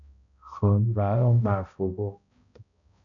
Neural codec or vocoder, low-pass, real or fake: codec, 16 kHz, 0.5 kbps, X-Codec, HuBERT features, trained on balanced general audio; 7.2 kHz; fake